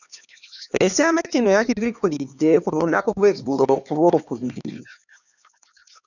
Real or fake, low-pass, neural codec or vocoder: fake; 7.2 kHz; codec, 16 kHz, 2 kbps, X-Codec, HuBERT features, trained on LibriSpeech